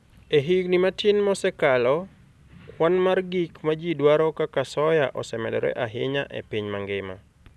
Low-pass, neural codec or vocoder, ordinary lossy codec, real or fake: none; none; none; real